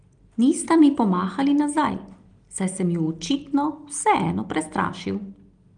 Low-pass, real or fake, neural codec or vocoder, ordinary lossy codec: 9.9 kHz; real; none; Opus, 24 kbps